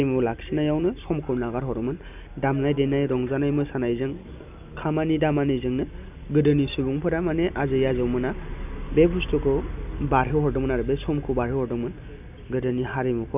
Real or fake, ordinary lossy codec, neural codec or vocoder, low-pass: real; none; none; 3.6 kHz